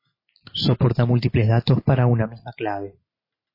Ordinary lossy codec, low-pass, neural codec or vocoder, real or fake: MP3, 24 kbps; 5.4 kHz; none; real